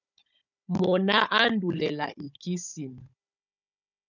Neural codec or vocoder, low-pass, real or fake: codec, 16 kHz, 16 kbps, FunCodec, trained on Chinese and English, 50 frames a second; 7.2 kHz; fake